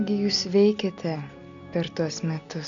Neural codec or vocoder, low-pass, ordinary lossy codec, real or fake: none; 7.2 kHz; MP3, 96 kbps; real